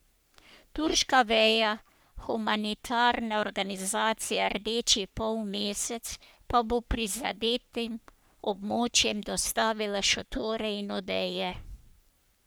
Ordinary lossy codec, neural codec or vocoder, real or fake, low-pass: none; codec, 44.1 kHz, 3.4 kbps, Pupu-Codec; fake; none